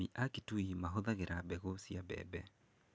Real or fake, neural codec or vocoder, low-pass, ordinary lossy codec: real; none; none; none